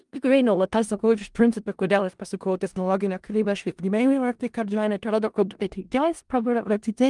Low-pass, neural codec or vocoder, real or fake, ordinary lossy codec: 10.8 kHz; codec, 16 kHz in and 24 kHz out, 0.4 kbps, LongCat-Audio-Codec, four codebook decoder; fake; Opus, 32 kbps